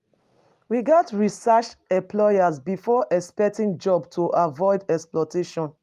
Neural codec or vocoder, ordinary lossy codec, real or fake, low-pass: none; Opus, 32 kbps; real; 14.4 kHz